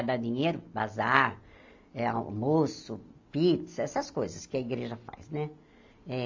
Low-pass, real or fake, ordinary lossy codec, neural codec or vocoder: 7.2 kHz; real; AAC, 48 kbps; none